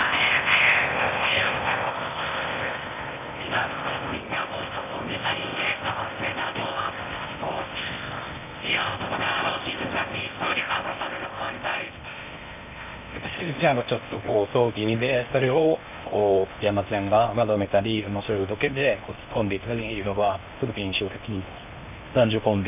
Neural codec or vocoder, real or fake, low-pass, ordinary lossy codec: codec, 16 kHz in and 24 kHz out, 0.6 kbps, FocalCodec, streaming, 4096 codes; fake; 3.6 kHz; none